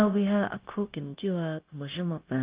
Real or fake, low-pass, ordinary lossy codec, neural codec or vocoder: fake; 3.6 kHz; Opus, 32 kbps; codec, 16 kHz, 0.9 kbps, LongCat-Audio-Codec